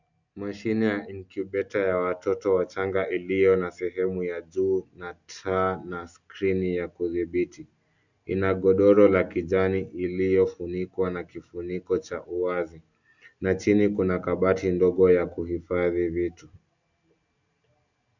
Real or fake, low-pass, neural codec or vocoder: real; 7.2 kHz; none